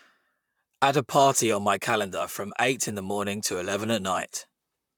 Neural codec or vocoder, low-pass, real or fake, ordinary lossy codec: vocoder, 44.1 kHz, 128 mel bands, Pupu-Vocoder; 19.8 kHz; fake; none